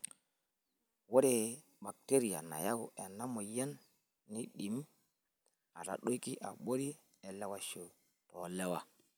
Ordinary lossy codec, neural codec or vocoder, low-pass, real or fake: none; none; none; real